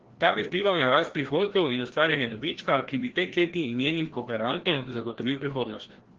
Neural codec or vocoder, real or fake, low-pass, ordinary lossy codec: codec, 16 kHz, 1 kbps, FreqCodec, larger model; fake; 7.2 kHz; Opus, 16 kbps